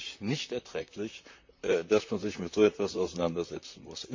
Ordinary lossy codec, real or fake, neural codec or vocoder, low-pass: MP3, 48 kbps; fake; vocoder, 44.1 kHz, 128 mel bands, Pupu-Vocoder; 7.2 kHz